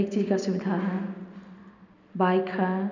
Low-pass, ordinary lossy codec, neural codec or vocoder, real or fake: 7.2 kHz; none; none; real